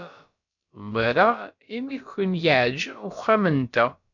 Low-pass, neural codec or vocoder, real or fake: 7.2 kHz; codec, 16 kHz, about 1 kbps, DyCAST, with the encoder's durations; fake